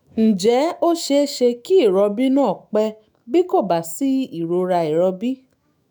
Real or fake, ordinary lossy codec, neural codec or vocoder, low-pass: fake; none; autoencoder, 48 kHz, 128 numbers a frame, DAC-VAE, trained on Japanese speech; none